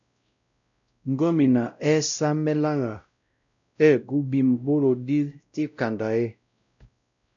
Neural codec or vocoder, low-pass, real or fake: codec, 16 kHz, 0.5 kbps, X-Codec, WavLM features, trained on Multilingual LibriSpeech; 7.2 kHz; fake